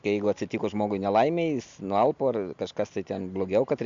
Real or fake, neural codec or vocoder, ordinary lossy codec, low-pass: real; none; MP3, 64 kbps; 7.2 kHz